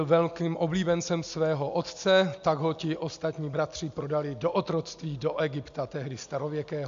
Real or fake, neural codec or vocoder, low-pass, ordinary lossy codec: real; none; 7.2 kHz; AAC, 64 kbps